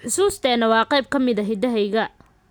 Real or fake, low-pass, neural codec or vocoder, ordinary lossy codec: real; none; none; none